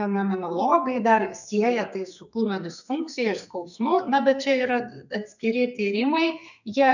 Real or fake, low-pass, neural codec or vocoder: fake; 7.2 kHz; codec, 32 kHz, 1.9 kbps, SNAC